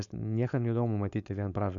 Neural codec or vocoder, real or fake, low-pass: codec, 16 kHz, 4.8 kbps, FACodec; fake; 7.2 kHz